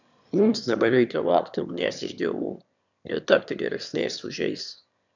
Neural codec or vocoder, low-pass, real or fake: autoencoder, 22.05 kHz, a latent of 192 numbers a frame, VITS, trained on one speaker; 7.2 kHz; fake